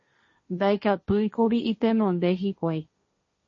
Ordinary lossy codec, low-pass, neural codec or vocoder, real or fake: MP3, 32 kbps; 7.2 kHz; codec, 16 kHz, 1.1 kbps, Voila-Tokenizer; fake